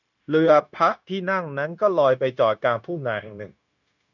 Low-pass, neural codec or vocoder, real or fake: 7.2 kHz; codec, 16 kHz, 0.9 kbps, LongCat-Audio-Codec; fake